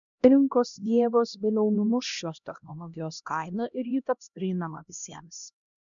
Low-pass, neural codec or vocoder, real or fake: 7.2 kHz; codec, 16 kHz, 1 kbps, X-Codec, HuBERT features, trained on LibriSpeech; fake